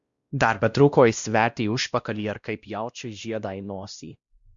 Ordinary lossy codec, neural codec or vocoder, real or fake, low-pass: Opus, 64 kbps; codec, 16 kHz, 1 kbps, X-Codec, WavLM features, trained on Multilingual LibriSpeech; fake; 7.2 kHz